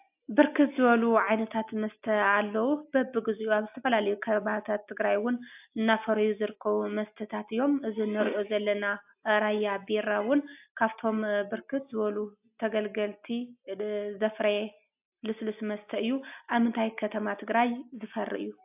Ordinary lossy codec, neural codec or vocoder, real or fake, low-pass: AAC, 32 kbps; none; real; 3.6 kHz